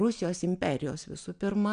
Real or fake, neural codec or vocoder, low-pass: real; none; 9.9 kHz